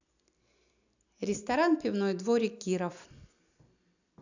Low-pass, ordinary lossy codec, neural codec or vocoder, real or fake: 7.2 kHz; none; none; real